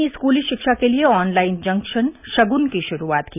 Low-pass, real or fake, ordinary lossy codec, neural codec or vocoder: 3.6 kHz; real; none; none